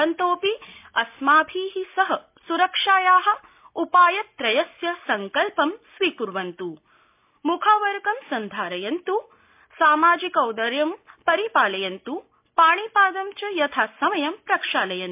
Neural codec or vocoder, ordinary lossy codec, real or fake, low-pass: none; MP3, 32 kbps; real; 3.6 kHz